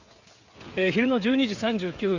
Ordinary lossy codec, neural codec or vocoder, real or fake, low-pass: Opus, 64 kbps; codec, 16 kHz, 16 kbps, FreqCodec, smaller model; fake; 7.2 kHz